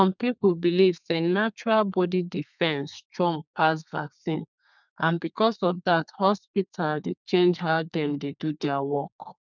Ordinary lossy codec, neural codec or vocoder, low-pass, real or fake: none; codec, 32 kHz, 1.9 kbps, SNAC; 7.2 kHz; fake